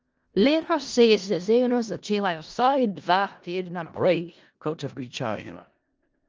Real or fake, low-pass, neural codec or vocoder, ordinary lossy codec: fake; 7.2 kHz; codec, 16 kHz in and 24 kHz out, 0.4 kbps, LongCat-Audio-Codec, four codebook decoder; Opus, 24 kbps